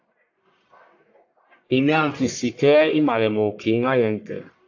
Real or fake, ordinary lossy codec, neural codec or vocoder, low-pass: fake; AAC, 48 kbps; codec, 44.1 kHz, 1.7 kbps, Pupu-Codec; 7.2 kHz